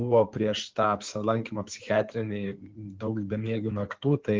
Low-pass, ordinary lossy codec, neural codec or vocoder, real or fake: 7.2 kHz; Opus, 16 kbps; codec, 16 kHz in and 24 kHz out, 2.2 kbps, FireRedTTS-2 codec; fake